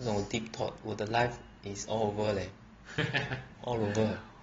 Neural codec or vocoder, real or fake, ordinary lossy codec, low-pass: none; real; AAC, 24 kbps; 7.2 kHz